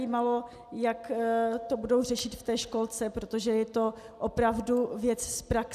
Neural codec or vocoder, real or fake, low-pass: none; real; 14.4 kHz